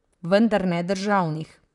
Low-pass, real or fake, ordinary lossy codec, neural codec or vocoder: 10.8 kHz; fake; none; vocoder, 44.1 kHz, 128 mel bands, Pupu-Vocoder